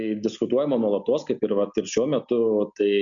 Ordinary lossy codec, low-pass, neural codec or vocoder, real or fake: MP3, 96 kbps; 7.2 kHz; none; real